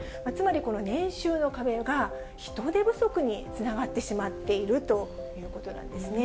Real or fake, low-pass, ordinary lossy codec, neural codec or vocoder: real; none; none; none